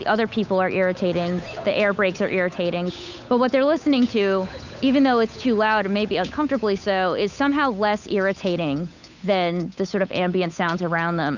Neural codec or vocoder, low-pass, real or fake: codec, 16 kHz, 8 kbps, FunCodec, trained on Chinese and English, 25 frames a second; 7.2 kHz; fake